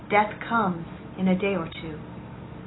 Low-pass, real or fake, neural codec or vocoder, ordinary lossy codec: 7.2 kHz; real; none; AAC, 16 kbps